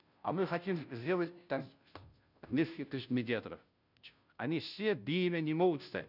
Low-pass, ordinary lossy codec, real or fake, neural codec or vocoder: 5.4 kHz; none; fake; codec, 16 kHz, 0.5 kbps, FunCodec, trained on Chinese and English, 25 frames a second